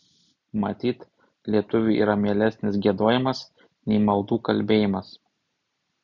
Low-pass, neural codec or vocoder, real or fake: 7.2 kHz; none; real